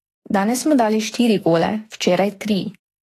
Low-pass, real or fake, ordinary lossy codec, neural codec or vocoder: 14.4 kHz; fake; AAC, 48 kbps; autoencoder, 48 kHz, 32 numbers a frame, DAC-VAE, trained on Japanese speech